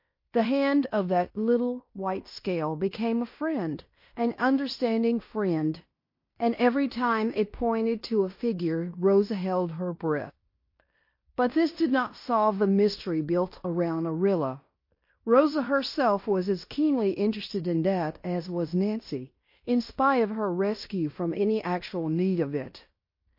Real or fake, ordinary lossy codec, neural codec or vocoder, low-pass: fake; MP3, 32 kbps; codec, 16 kHz in and 24 kHz out, 0.9 kbps, LongCat-Audio-Codec, fine tuned four codebook decoder; 5.4 kHz